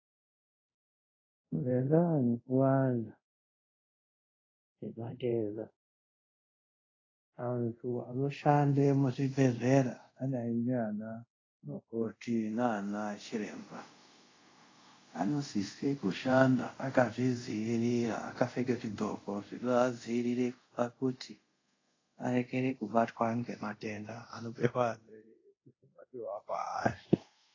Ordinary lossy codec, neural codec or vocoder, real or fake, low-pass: AAC, 32 kbps; codec, 24 kHz, 0.5 kbps, DualCodec; fake; 7.2 kHz